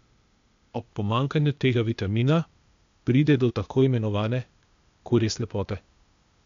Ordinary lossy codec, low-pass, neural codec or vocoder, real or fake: MP3, 64 kbps; 7.2 kHz; codec, 16 kHz, 0.8 kbps, ZipCodec; fake